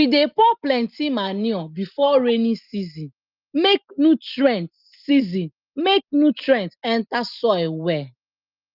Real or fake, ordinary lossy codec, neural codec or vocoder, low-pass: real; Opus, 24 kbps; none; 5.4 kHz